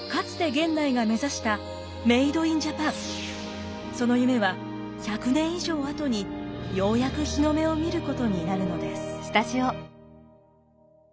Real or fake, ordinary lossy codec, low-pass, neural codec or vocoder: real; none; none; none